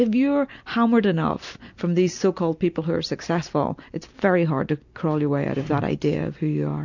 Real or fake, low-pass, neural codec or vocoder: real; 7.2 kHz; none